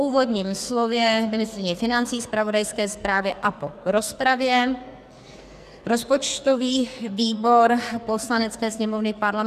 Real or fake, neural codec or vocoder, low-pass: fake; codec, 44.1 kHz, 2.6 kbps, SNAC; 14.4 kHz